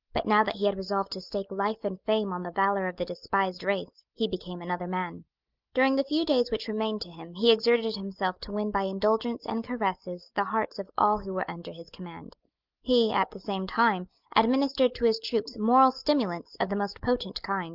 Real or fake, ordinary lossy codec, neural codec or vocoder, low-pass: real; Opus, 24 kbps; none; 5.4 kHz